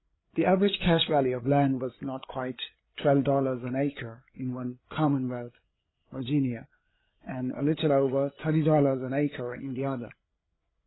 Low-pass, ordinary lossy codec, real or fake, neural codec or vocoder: 7.2 kHz; AAC, 16 kbps; fake; codec, 16 kHz, 8 kbps, FreqCodec, larger model